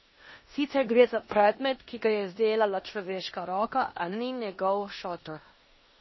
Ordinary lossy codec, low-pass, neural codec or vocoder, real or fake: MP3, 24 kbps; 7.2 kHz; codec, 16 kHz in and 24 kHz out, 0.9 kbps, LongCat-Audio-Codec, four codebook decoder; fake